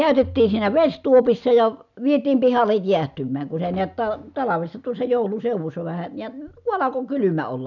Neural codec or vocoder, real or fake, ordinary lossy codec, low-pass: none; real; none; 7.2 kHz